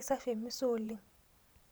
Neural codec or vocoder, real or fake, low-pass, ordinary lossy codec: vocoder, 44.1 kHz, 128 mel bands every 512 samples, BigVGAN v2; fake; none; none